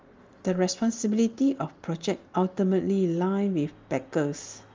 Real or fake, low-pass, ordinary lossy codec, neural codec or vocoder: real; 7.2 kHz; Opus, 32 kbps; none